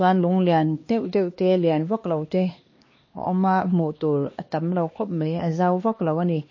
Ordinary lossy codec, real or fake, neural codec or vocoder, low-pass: MP3, 32 kbps; fake; codec, 16 kHz, 2 kbps, X-Codec, HuBERT features, trained on LibriSpeech; 7.2 kHz